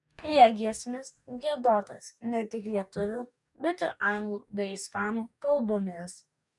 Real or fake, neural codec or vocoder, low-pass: fake; codec, 44.1 kHz, 2.6 kbps, DAC; 10.8 kHz